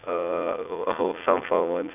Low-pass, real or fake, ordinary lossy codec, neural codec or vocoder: 3.6 kHz; fake; none; vocoder, 44.1 kHz, 80 mel bands, Vocos